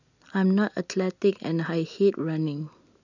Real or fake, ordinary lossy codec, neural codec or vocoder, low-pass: real; none; none; 7.2 kHz